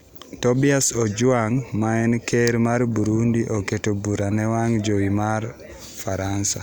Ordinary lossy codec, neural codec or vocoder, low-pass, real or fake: none; none; none; real